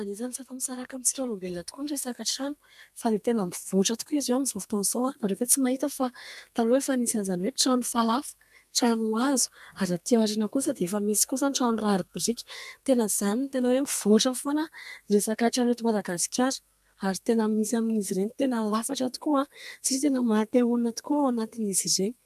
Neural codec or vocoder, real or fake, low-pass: codec, 32 kHz, 1.9 kbps, SNAC; fake; 14.4 kHz